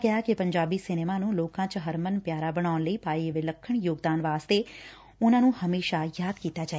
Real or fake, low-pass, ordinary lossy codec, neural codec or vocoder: real; none; none; none